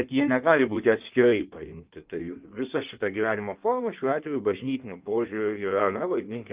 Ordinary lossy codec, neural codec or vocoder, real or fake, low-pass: Opus, 24 kbps; codec, 16 kHz in and 24 kHz out, 1.1 kbps, FireRedTTS-2 codec; fake; 3.6 kHz